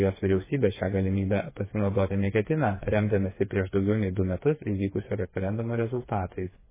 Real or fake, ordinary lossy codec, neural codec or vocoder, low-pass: fake; MP3, 16 kbps; codec, 16 kHz, 4 kbps, FreqCodec, smaller model; 3.6 kHz